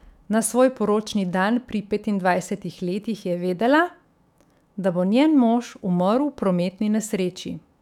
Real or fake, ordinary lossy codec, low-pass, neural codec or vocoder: fake; none; 19.8 kHz; autoencoder, 48 kHz, 128 numbers a frame, DAC-VAE, trained on Japanese speech